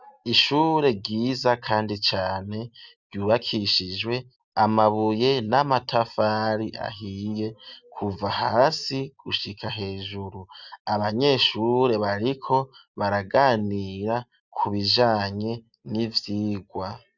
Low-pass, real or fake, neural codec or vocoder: 7.2 kHz; real; none